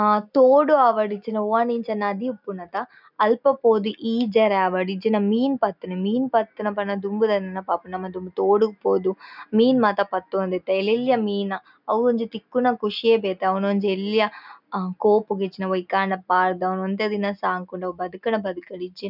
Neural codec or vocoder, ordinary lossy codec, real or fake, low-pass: none; none; real; 5.4 kHz